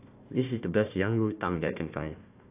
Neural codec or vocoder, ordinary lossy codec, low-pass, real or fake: codec, 16 kHz, 1 kbps, FunCodec, trained on Chinese and English, 50 frames a second; AAC, 32 kbps; 3.6 kHz; fake